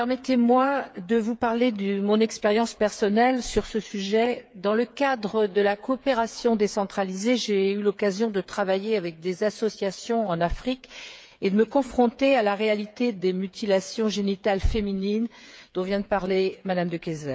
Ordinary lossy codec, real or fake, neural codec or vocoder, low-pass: none; fake; codec, 16 kHz, 8 kbps, FreqCodec, smaller model; none